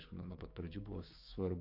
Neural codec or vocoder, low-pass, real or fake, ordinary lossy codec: codec, 16 kHz, 6 kbps, DAC; 5.4 kHz; fake; AAC, 32 kbps